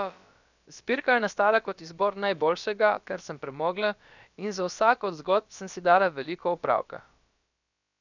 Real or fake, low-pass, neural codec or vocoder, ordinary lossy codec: fake; 7.2 kHz; codec, 16 kHz, about 1 kbps, DyCAST, with the encoder's durations; none